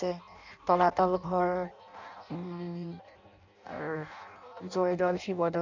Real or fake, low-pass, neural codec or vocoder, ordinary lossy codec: fake; 7.2 kHz; codec, 16 kHz in and 24 kHz out, 0.6 kbps, FireRedTTS-2 codec; none